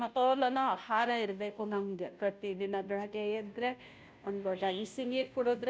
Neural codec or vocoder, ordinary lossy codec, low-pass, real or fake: codec, 16 kHz, 0.5 kbps, FunCodec, trained on Chinese and English, 25 frames a second; none; none; fake